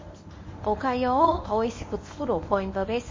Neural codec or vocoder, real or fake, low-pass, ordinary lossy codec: codec, 24 kHz, 0.9 kbps, WavTokenizer, medium speech release version 1; fake; 7.2 kHz; MP3, 32 kbps